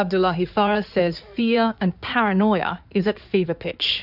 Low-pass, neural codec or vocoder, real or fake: 5.4 kHz; codec, 16 kHz in and 24 kHz out, 2.2 kbps, FireRedTTS-2 codec; fake